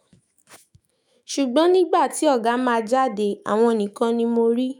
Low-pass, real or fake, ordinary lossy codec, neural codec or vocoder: 19.8 kHz; fake; none; autoencoder, 48 kHz, 128 numbers a frame, DAC-VAE, trained on Japanese speech